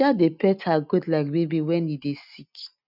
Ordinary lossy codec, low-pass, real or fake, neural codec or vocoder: none; 5.4 kHz; real; none